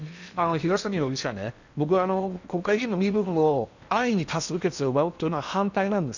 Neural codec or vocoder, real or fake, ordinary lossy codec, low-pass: codec, 16 kHz in and 24 kHz out, 0.8 kbps, FocalCodec, streaming, 65536 codes; fake; none; 7.2 kHz